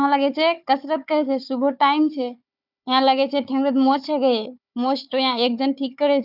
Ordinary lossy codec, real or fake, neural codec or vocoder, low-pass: none; fake; codec, 16 kHz, 4 kbps, FunCodec, trained on Chinese and English, 50 frames a second; 5.4 kHz